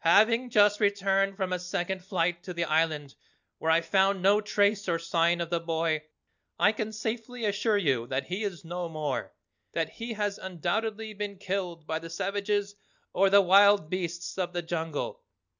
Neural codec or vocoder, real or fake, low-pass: none; real; 7.2 kHz